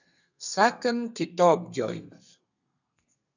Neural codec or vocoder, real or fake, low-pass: codec, 32 kHz, 1.9 kbps, SNAC; fake; 7.2 kHz